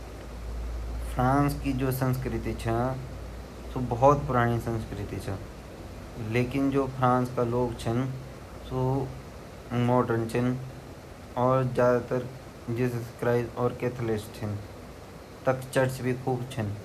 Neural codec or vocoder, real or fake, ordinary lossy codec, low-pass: none; real; none; 14.4 kHz